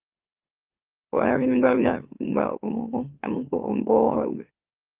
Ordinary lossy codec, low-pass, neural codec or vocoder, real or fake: Opus, 32 kbps; 3.6 kHz; autoencoder, 44.1 kHz, a latent of 192 numbers a frame, MeloTTS; fake